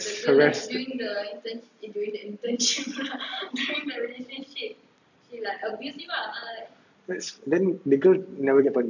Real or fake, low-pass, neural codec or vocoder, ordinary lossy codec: real; 7.2 kHz; none; none